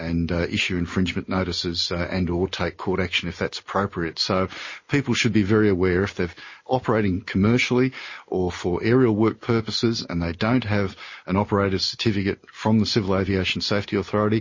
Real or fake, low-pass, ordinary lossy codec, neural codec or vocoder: real; 7.2 kHz; MP3, 32 kbps; none